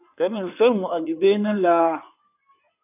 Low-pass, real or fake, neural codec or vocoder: 3.6 kHz; fake; codec, 16 kHz in and 24 kHz out, 2.2 kbps, FireRedTTS-2 codec